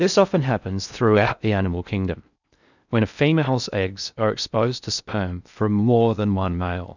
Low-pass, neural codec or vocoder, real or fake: 7.2 kHz; codec, 16 kHz in and 24 kHz out, 0.6 kbps, FocalCodec, streaming, 4096 codes; fake